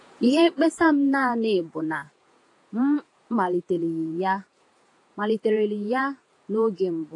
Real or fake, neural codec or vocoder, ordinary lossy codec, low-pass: fake; vocoder, 48 kHz, 128 mel bands, Vocos; AAC, 48 kbps; 10.8 kHz